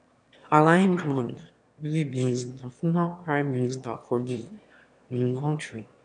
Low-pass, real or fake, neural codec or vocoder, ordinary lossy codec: 9.9 kHz; fake; autoencoder, 22.05 kHz, a latent of 192 numbers a frame, VITS, trained on one speaker; none